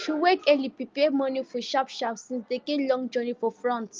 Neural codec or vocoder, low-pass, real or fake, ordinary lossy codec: none; 7.2 kHz; real; Opus, 32 kbps